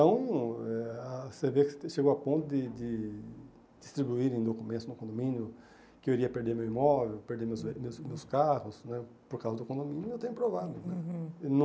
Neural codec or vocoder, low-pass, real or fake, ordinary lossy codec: none; none; real; none